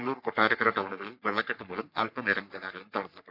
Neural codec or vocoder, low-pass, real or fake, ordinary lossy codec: codec, 44.1 kHz, 3.4 kbps, Pupu-Codec; 5.4 kHz; fake; none